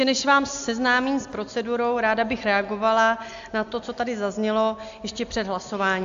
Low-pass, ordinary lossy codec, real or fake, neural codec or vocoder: 7.2 kHz; AAC, 64 kbps; real; none